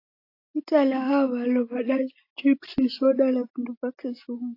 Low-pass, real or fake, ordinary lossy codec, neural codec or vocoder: 5.4 kHz; real; AAC, 32 kbps; none